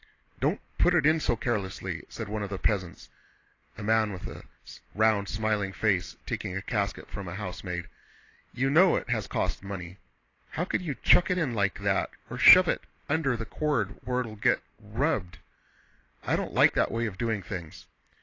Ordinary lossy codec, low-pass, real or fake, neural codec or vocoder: AAC, 32 kbps; 7.2 kHz; real; none